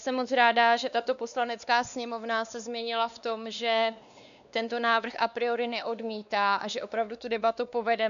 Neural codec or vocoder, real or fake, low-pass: codec, 16 kHz, 2 kbps, X-Codec, WavLM features, trained on Multilingual LibriSpeech; fake; 7.2 kHz